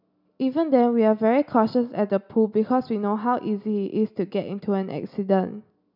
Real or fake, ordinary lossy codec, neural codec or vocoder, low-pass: real; none; none; 5.4 kHz